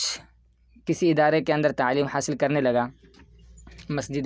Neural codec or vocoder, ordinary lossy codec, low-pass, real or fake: none; none; none; real